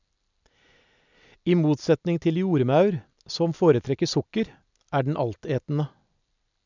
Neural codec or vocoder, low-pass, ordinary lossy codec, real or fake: none; 7.2 kHz; none; real